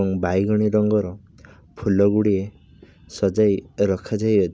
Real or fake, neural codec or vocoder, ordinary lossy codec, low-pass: real; none; none; none